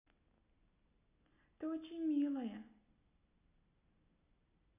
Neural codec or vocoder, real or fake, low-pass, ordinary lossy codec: none; real; 3.6 kHz; none